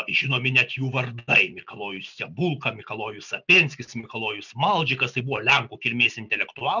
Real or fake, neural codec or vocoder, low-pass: real; none; 7.2 kHz